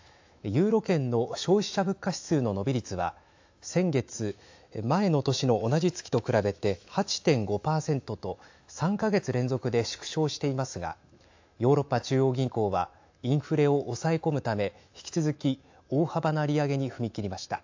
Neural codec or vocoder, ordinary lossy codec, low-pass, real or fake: autoencoder, 48 kHz, 128 numbers a frame, DAC-VAE, trained on Japanese speech; AAC, 48 kbps; 7.2 kHz; fake